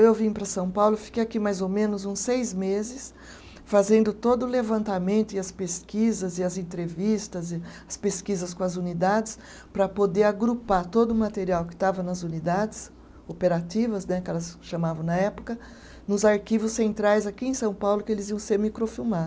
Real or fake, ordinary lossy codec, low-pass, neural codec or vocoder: real; none; none; none